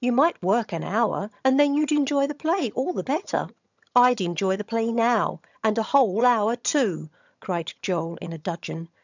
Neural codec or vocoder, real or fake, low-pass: vocoder, 22.05 kHz, 80 mel bands, HiFi-GAN; fake; 7.2 kHz